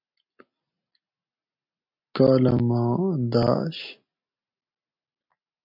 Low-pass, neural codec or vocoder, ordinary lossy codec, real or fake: 5.4 kHz; none; MP3, 48 kbps; real